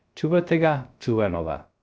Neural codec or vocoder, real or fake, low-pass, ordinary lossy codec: codec, 16 kHz, 0.3 kbps, FocalCodec; fake; none; none